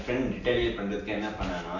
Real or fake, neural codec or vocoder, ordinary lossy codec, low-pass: real; none; none; 7.2 kHz